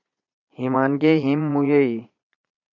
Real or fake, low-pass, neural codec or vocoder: fake; 7.2 kHz; vocoder, 22.05 kHz, 80 mel bands, Vocos